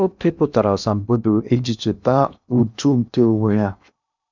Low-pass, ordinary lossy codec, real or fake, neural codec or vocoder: 7.2 kHz; none; fake; codec, 16 kHz in and 24 kHz out, 0.6 kbps, FocalCodec, streaming, 2048 codes